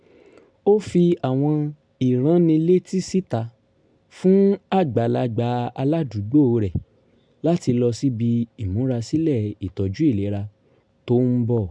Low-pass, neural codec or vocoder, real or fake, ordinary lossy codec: 9.9 kHz; none; real; MP3, 96 kbps